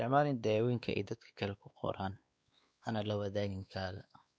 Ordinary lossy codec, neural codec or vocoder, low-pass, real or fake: none; codec, 16 kHz, 2 kbps, X-Codec, WavLM features, trained on Multilingual LibriSpeech; none; fake